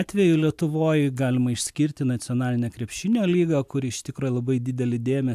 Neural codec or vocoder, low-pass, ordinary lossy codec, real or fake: none; 14.4 kHz; AAC, 96 kbps; real